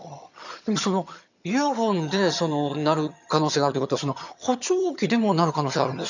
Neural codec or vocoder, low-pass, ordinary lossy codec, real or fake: vocoder, 22.05 kHz, 80 mel bands, HiFi-GAN; 7.2 kHz; none; fake